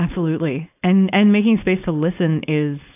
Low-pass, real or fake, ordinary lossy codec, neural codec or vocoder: 3.6 kHz; fake; AAC, 32 kbps; codec, 16 kHz, 4.8 kbps, FACodec